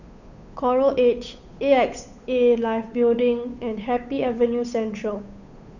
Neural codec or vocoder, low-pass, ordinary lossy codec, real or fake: codec, 16 kHz, 8 kbps, FunCodec, trained on Chinese and English, 25 frames a second; 7.2 kHz; none; fake